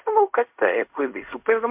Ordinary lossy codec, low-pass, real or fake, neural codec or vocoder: MP3, 32 kbps; 3.6 kHz; fake; codec, 16 kHz in and 24 kHz out, 0.4 kbps, LongCat-Audio-Codec, fine tuned four codebook decoder